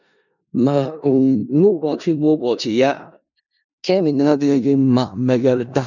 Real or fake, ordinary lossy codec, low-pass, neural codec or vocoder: fake; none; 7.2 kHz; codec, 16 kHz in and 24 kHz out, 0.4 kbps, LongCat-Audio-Codec, four codebook decoder